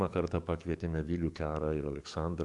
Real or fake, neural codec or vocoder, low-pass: fake; codec, 44.1 kHz, 7.8 kbps, DAC; 10.8 kHz